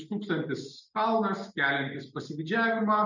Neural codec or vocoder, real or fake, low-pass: none; real; 7.2 kHz